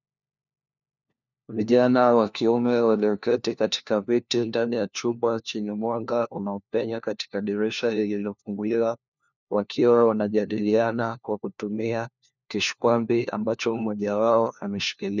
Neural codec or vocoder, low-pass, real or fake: codec, 16 kHz, 1 kbps, FunCodec, trained on LibriTTS, 50 frames a second; 7.2 kHz; fake